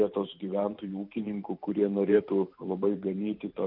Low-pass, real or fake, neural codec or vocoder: 5.4 kHz; fake; vocoder, 44.1 kHz, 128 mel bands every 512 samples, BigVGAN v2